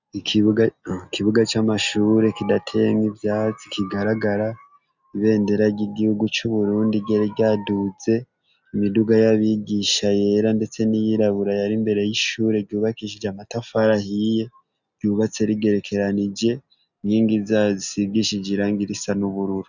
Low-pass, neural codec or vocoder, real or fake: 7.2 kHz; none; real